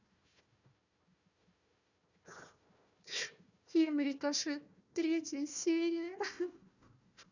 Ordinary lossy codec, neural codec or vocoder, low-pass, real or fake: none; codec, 16 kHz, 1 kbps, FunCodec, trained on Chinese and English, 50 frames a second; 7.2 kHz; fake